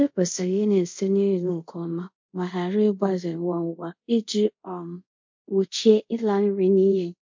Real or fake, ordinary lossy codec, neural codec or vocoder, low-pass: fake; MP3, 48 kbps; codec, 24 kHz, 0.5 kbps, DualCodec; 7.2 kHz